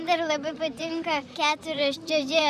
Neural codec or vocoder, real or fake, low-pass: vocoder, 44.1 kHz, 128 mel bands, Pupu-Vocoder; fake; 14.4 kHz